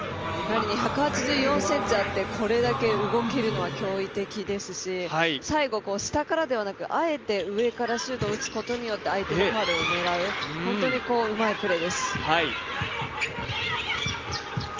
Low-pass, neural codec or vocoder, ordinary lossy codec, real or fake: 7.2 kHz; none; Opus, 24 kbps; real